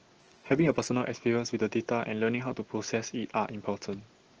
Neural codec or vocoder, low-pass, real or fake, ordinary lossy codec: none; 7.2 kHz; real; Opus, 16 kbps